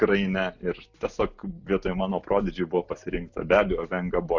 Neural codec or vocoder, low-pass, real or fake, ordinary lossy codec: none; 7.2 kHz; real; Opus, 64 kbps